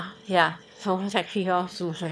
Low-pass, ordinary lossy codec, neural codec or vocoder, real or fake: none; none; autoencoder, 22.05 kHz, a latent of 192 numbers a frame, VITS, trained on one speaker; fake